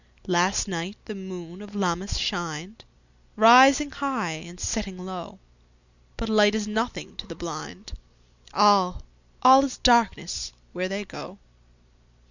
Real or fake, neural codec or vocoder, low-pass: real; none; 7.2 kHz